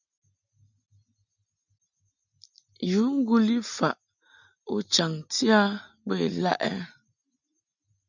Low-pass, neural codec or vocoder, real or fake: 7.2 kHz; none; real